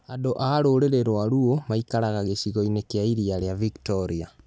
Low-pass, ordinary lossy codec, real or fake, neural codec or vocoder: none; none; real; none